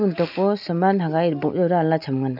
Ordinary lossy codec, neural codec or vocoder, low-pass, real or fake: none; none; 5.4 kHz; real